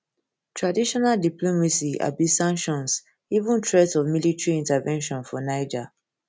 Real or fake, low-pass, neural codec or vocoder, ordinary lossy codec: real; none; none; none